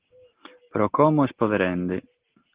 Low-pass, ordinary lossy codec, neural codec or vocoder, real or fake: 3.6 kHz; Opus, 16 kbps; none; real